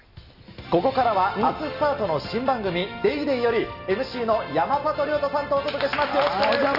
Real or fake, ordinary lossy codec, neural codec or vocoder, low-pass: real; none; none; 5.4 kHz